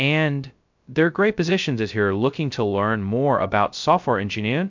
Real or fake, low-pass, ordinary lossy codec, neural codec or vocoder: fake; 7.2 kHz; MP3, 64 kbps; codec, 16 kHz, 0.2 kbps, FocalCodec